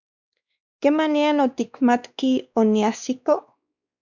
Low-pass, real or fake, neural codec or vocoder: 7.2 kHz; fake; codec, 16 kHz, 2 kbps, X-Codec, WavLM features, trained on Multilingual LibriSpeech